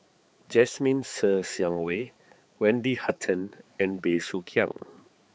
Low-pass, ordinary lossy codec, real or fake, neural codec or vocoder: none; none; fake; codec, 16 kHz, 4 kbps, X-Codec, HuBERT features, trained on balanced general audio